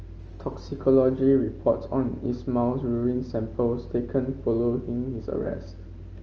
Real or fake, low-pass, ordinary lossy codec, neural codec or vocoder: real; 7.2 kHz; Opus, 24 kbps; none